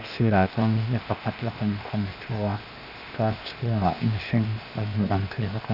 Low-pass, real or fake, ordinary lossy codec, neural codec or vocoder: 5.4 kHz; fake; none; codec, 16 kHz, 0.8 kbps, ZipCodec